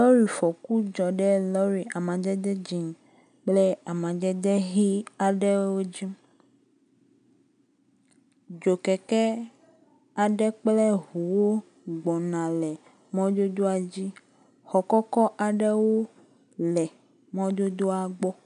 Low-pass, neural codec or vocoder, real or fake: 9.9 kHz; none; real